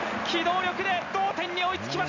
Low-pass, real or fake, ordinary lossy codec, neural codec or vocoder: 7.2 kHz; real; Opus, 64 kbps; none